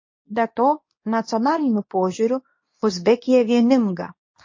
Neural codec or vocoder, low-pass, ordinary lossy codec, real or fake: codec, 24 kHz, 0.9 kbps, WavTokenizer, medium speech release version 2; 7.2 kHz; MP3, 32 kbps; fake